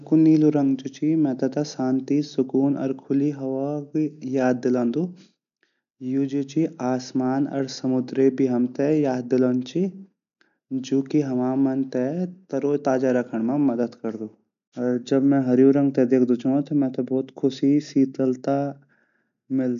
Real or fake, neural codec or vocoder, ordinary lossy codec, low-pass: real; none; none; 7.2 kHz